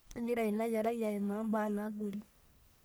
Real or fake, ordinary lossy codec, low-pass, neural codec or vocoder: fake; none; none; codec, 44.1 kHz, 1.7 kbps, Pupu-Codec